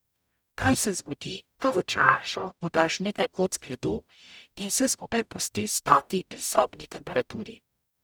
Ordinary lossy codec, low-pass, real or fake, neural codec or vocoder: none; none; fake; codec, 44.1 kHz, 0.9 kbps, DAC